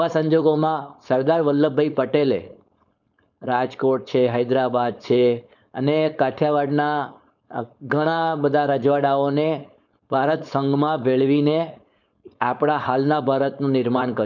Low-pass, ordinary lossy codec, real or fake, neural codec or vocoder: 7.2 kHz; none; fake; codec, 16 kHz, 4.8 kbps, FACodec